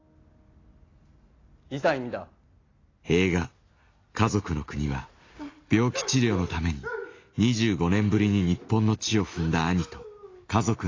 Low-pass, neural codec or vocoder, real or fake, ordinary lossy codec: 7.2 kHz; none; real; AAC, 32 kbps